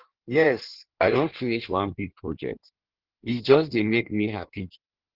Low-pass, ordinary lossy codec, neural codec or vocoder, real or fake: 5.4 kHz; Opus, 16 kbps; codec, 16 kHz in and 24 kHz out, 1.1 kbps, FireRedTTS-2 codec; fake